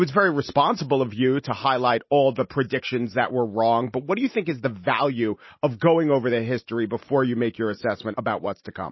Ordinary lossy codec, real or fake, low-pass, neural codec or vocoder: MP3, 24 kbps; real; 7.2 kHz; none